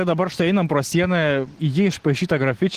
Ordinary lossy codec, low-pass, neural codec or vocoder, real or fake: Opus, 16 kbps; 14.4 kHz; none; real